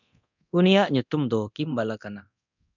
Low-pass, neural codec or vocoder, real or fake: 7.2 kHz; codec, 24 kHz, 0.9 kbps, DualCodec; fake